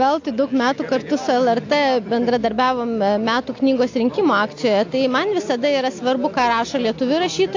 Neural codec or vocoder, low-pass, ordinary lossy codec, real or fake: none; 7.2 kHz; AAC, 48 kbps; real